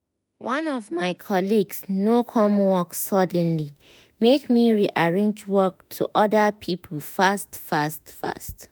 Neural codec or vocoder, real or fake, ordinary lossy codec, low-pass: autoencoder, 48 kHz, 32 numbers a frame, DAC-VAE, trained on Japanese speech; fake; none; none